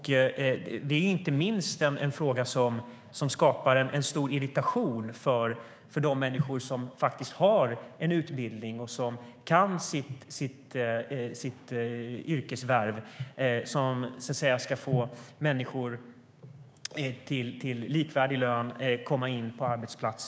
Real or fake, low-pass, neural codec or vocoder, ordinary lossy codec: fake; none; codec, 16 kHz, 6 kbps, DAC; none